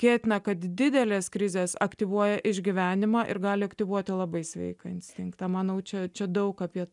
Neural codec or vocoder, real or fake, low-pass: none; real; 10.8 kHz